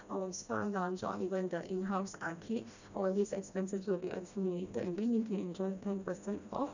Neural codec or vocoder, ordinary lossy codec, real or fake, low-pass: codec, 16 kHz, 1 kbps, FreqCodec, smaller model; none; fake; 7.2 kHz